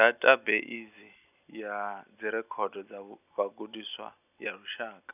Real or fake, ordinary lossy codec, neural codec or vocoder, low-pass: real; none; none; 3.6 kHz